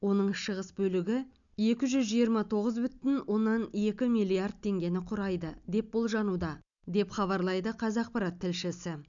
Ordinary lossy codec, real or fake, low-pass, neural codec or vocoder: none; real; 7.2 kHz; none